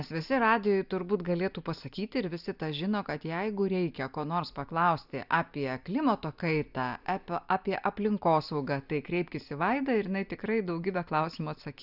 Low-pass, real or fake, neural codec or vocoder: 5.4 kHz; real; none